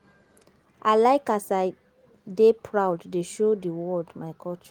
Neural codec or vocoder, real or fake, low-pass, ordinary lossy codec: none; real; 19.8 kHz; Opus, 24 kbps